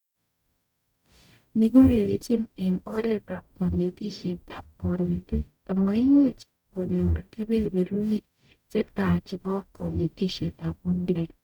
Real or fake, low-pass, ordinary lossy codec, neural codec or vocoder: fake; 19.8 kHz; none; codec, 44.1 kHz, 0.9 kbps, DAC